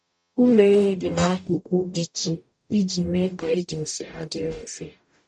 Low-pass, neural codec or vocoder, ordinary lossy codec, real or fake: 9.9 kHz; codec, 44.1 kHz, 0.9 kbps, DAC; none; fake